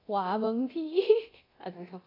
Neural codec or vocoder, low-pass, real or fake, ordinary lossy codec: codec, 24 kHz, 0.9 kbps, DualCodec; 5.4 kHz; fake; AAC, 24 kbps